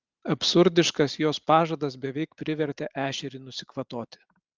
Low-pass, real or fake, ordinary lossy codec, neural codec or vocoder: 7.2 kHz; real; Opus, 32 kbps; none